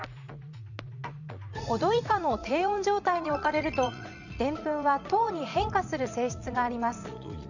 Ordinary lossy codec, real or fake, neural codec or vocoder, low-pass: none; fake; vocoder, 44.1 kHz, 80 mel bands, Vocos; 7.2 kHz